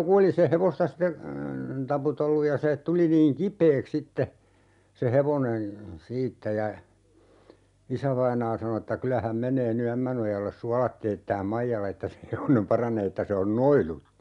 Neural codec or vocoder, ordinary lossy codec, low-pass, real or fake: none; none; 10.8 kHz; real